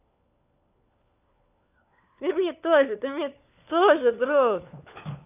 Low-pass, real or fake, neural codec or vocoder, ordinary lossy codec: 3.6 kHz; fake; codec, 16 kHz, 4 kbps, FunCodec, trained on LibriTTS, 50 frames a second; none